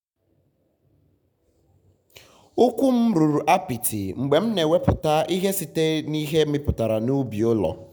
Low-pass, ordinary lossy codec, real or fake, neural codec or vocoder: none; none; real; none